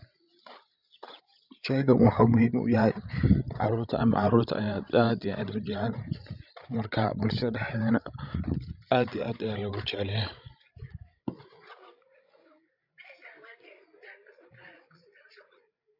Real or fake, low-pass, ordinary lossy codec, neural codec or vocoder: fake; 5.4 kHz; none; codec, 16 kHz, 16 kbps, FreqCodec, larger model